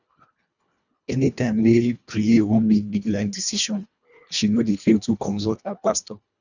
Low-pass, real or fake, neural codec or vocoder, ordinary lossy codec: 7.2 kHz; fake; codec, 24 kHz, 1.5 kbps, HILCodec; none